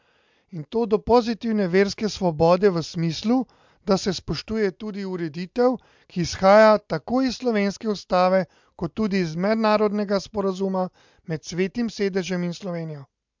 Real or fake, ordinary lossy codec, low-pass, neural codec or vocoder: real; MP3, 64 kbps; 7.2 kHz; none